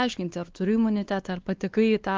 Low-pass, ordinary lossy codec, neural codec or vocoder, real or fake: 7.2 kHz; Opus, 24 kbps; codec, 16 kHz, 2 kbps, X-Codec, WavLM features, trained on Multilingual LibriSpeech; fake